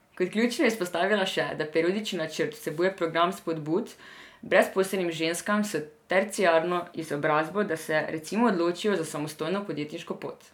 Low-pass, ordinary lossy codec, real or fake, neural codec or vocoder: 19.8 kHz; none; real; none